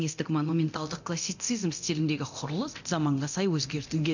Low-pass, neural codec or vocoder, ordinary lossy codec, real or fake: 7.2 kHz; codec, 24 kHz, 0.9 kbps, DualCodec; none; fake